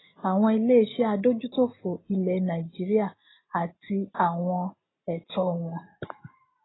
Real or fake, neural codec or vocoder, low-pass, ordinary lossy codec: real; none; 7.2 kHz; AAC, 16 kbps